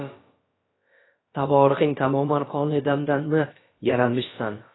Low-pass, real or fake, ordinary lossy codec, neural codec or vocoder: 7.2 kHz; fake; AAC, 16 kbps; codec, 16 kHz, about 1 kbps, DyCAST, with the encoder's durations